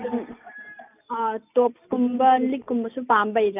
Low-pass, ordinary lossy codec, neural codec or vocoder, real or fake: 3.6 kHz; none; none; real